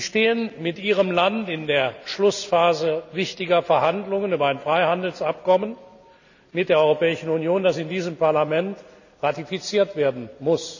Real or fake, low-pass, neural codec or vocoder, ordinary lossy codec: real; 7.2 kHz; none; none